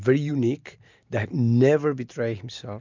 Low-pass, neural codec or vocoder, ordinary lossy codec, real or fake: 7.2 kHz; none; MP3, 64 kbps; real